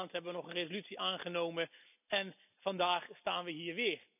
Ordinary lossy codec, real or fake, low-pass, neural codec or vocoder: none; real; 3.6 kHz; none